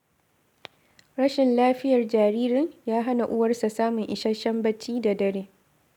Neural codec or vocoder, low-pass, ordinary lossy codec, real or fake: none; 19.8 kHz; none; real